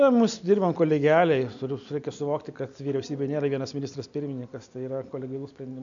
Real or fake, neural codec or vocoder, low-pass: real; none; 7.2 kHz